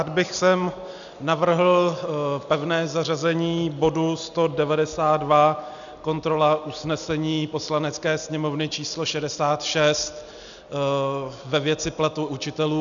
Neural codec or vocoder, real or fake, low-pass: none; real; 7.2 kHz